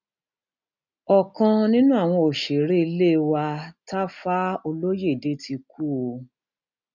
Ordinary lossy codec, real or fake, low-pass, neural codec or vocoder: none; real; 7.2 kHz; none